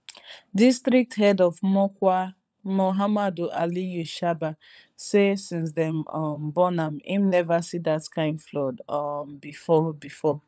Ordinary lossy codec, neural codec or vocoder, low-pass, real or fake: none; codec, 16 kHz, 16 kbps, FunCodec, trained on LibriTTS, 50 frames a second; none; fake